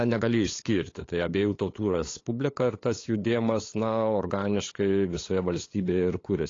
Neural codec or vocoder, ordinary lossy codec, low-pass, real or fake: codec, 16 kHz, 8 kbps, FunCodec, trained on LibriTTS, 25 frames a second; AAC, 32 kbps; 7.2 kHz; fake